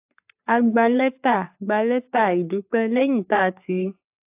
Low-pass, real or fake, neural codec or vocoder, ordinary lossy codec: 3.6 kHz; fake; codec, 44.1 kHz, 3.4 kbps, Pupu-Codec; AAC, 32 kbps